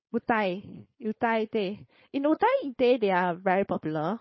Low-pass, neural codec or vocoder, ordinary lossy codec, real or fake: 7.2 kHz; codec, 16 kHz, 4.8 kbps, FACodec; MP3, 24 kbps; fake